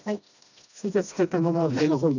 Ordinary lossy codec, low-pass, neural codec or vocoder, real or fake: none; 7.2 kHz; codec, 16 kHz, 1 kbps, FreqCodec, smaller model; fake